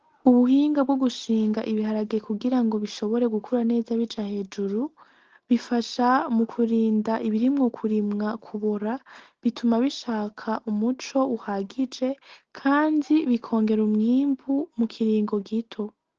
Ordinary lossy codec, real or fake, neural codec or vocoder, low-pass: Opus, 16 kbps; real; none; 7.2 kHz